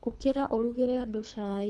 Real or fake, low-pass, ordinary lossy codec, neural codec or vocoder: fake; none; none; codec, 24 kHz, 3 kbps, HILCodec